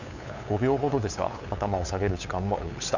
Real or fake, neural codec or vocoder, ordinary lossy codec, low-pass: fake; codec, 16 kHz, 8 kbps, FunCodec, trained on LibriTTS, 25 frames a second; none; 7.2 kHz